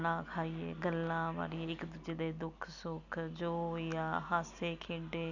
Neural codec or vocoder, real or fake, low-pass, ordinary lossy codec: none; real; 7.2 kHz; none